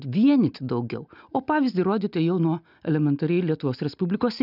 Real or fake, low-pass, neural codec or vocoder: real; 5.4 kHz; none